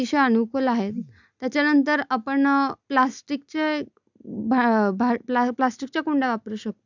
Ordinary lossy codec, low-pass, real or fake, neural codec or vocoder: none; 7.2 kHz; real; none